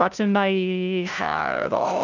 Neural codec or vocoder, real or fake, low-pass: codec, 16 kHz, 0.5 kbps, FunCodec, trained on LibriTTS, 25 frames a second; fake; 7.2 kHz